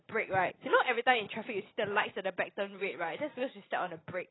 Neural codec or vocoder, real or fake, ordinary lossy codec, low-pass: none; real; AAC, 16 kbps; 7.2 kHz